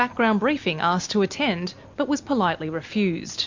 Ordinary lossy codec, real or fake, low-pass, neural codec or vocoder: MP3, 48 kbps; real; 7.2 kHz; none